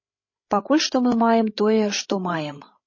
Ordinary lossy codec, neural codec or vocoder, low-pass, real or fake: MP3, 32 kbps; codec, 16 kHz, 8 kbps, FreqCodec, larger model; 7.2 kHz; fake